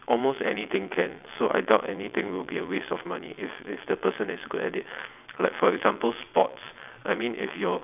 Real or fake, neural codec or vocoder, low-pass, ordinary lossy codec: fake; vocoder, 22.05 kHz, 80 mel bands, WaveNeXt; 3.6 kHz; none